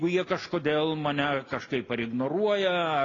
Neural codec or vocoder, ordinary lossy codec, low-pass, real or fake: none; AAC, 32 kbps; 7.2 kHz; real